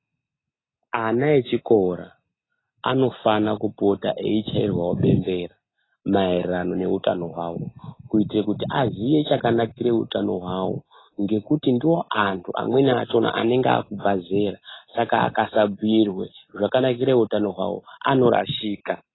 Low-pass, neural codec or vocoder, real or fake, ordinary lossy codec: 7.2 kHz; none; real; AAC, 16 kbps